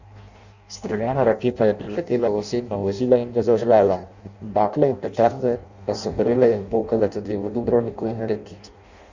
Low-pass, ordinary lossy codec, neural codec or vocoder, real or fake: 7.2 kHz; Opus, 64 kbps; codec, 16 kHz in and 24 kHz out, 0.6 kbps, FireRedTTS-2 codec; fake